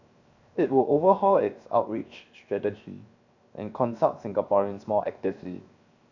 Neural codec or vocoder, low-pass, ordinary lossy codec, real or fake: codec, 16 kHz, 0.7 kbps, FocalCodec; 7.2 kHz; none; fake